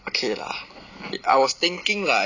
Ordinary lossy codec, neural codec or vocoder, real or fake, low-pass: none; none; real; 7.2 kHz